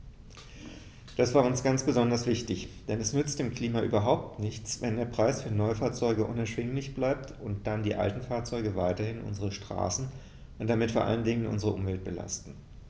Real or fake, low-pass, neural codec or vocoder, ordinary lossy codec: real; none; none; none